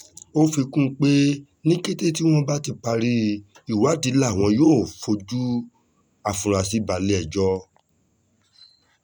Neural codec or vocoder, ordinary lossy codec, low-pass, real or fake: none; none; 19.8 kHz; real